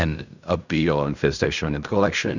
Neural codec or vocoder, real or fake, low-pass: codec, 16 kHz in and 24 kHz out, 0.4 kbps, LongCat-Audio-Codec, fine tuned four codebook decoder; fake; 7.2 kHz